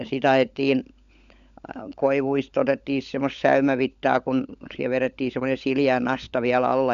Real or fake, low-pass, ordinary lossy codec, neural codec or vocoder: fake; 7.2 kHz; none; codec, 16 kHz, 16 kbps, FunCodec, trained on LibriTTS, 50 frames a second